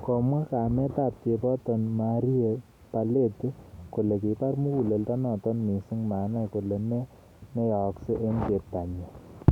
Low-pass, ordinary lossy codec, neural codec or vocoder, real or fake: 19.8 kHz; none; none; real